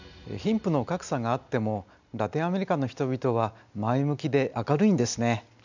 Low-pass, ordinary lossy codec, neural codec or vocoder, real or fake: 7.2 kHz; none; none; real